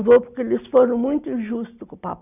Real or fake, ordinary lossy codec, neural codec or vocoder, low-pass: real; none; none; 3.6 kHz